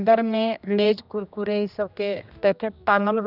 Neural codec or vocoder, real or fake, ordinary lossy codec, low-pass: codec, 16 kHz, 1 kbps, X-Codec, HuBERT features, trained on general audio; fake; none; 5.4 kHz